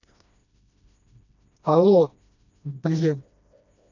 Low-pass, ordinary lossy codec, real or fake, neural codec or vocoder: 7.2 kHz; none; fake; codec, 16 kHz, 1 kbps, FreqCodec, smaller model